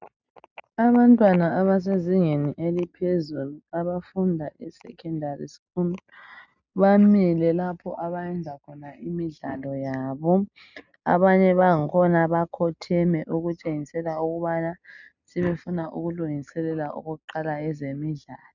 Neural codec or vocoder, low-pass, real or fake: none; 7.2 kHz; real